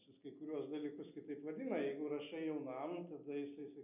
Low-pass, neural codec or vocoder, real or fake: 3.6 kHz; none; real